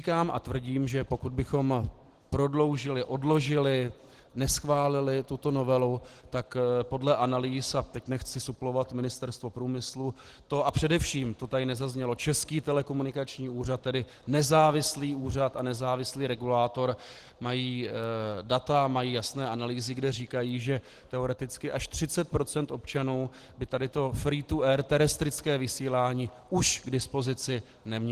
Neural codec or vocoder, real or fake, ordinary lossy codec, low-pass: none; real; Opus, 16 kbps; 14.4 kHz